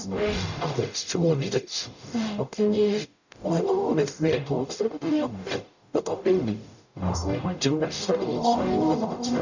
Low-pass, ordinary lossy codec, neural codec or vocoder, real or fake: 7.2 kHz; none; codec, 44.1 kHz, 0.9 kbps, DAC; fake